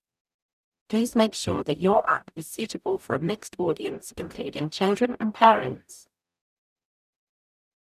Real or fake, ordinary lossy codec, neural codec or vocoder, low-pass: fake; none; codec, 44.1 kHz, 0.9 kbps, DAC; 14.4 kHz